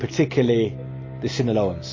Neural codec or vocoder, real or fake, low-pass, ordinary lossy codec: none; real; 7.2 kHz; MP3, 32 kbps